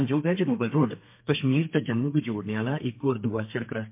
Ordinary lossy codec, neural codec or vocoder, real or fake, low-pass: MP3, 24 kbps; codec, 32 kHz, 1.9 kbps, SNAC; fake; 3.6 kHz